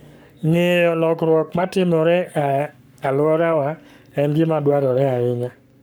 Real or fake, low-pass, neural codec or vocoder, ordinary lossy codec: fake; none; codec, 44.1 kHz, 7.8 kbps, Pupu-Codec; none